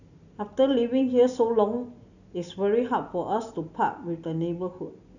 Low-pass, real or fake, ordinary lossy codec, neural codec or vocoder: 7.2 kHz; real; none; none